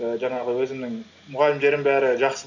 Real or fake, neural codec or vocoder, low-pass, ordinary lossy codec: real; none; 7.2 kHz; none